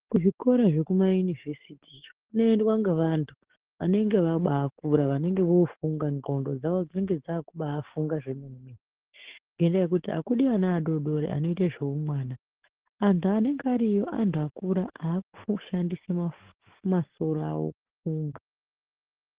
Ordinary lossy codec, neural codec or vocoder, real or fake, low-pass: Opus, 16 kbps; codec, 44.1 kHz, 7.8 kbps, DAC; fake; 3.6 kHz